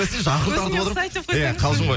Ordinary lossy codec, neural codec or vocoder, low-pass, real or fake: none; none; none; real